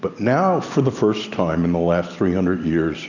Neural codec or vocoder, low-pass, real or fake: none; 7.2 kHz; real